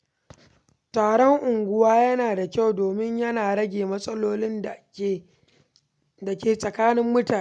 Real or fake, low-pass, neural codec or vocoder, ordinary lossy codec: real; none; none; none